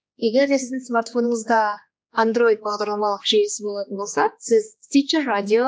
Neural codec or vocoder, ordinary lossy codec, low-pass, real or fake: codec, 16 kHz, 2 kbps, X-Codec, HuBERT features, trained on general audio; none; none; fake